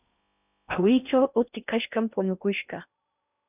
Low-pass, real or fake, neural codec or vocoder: 3.6 kHz; fake; codec, 16 kHz in and 24 kHz out, 0.6 kbps, FocalCodec, streaming, 4096 codes